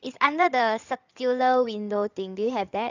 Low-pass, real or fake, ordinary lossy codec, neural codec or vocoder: 7.2 kHz; fake; none; codec, 16 kHz in and 24 kHz out, 2.2 kbps, FireRedTTS-2 codec